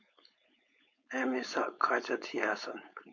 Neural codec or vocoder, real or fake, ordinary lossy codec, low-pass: codec, 16 kHz, 4.8 kbps, FACodec; fake; MP3, 64 kbps; 7.2 kHz